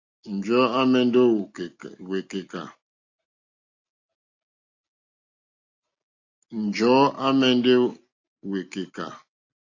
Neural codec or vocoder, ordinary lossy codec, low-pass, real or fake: none; AAC, 48 kbps; 7.2 kHz; real